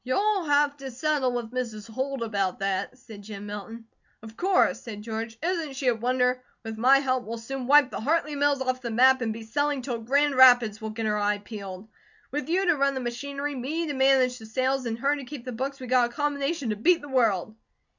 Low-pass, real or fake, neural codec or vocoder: 7.2 kHz; real; none